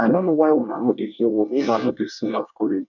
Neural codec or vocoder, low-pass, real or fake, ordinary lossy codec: codec, 24 kHz, 1 kbps, SNAC; 7.2 kHz; fake; none